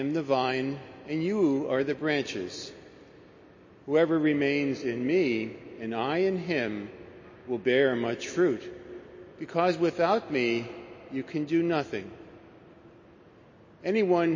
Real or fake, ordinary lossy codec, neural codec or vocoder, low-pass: real; MP3, 32 kbps; none; 7.2 kHz